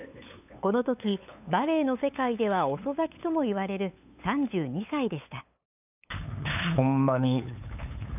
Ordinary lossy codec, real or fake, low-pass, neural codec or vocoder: none; fake; 3.6 kHz; codec, 16 kHz, 8 kbps, FunCodec, trained on LibriTTS, 25 frames a second